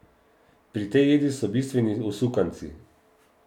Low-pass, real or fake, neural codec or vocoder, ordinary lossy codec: 19.8 kHz; real; none; none